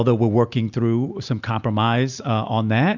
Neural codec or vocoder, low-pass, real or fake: none; 7.2 kHz; real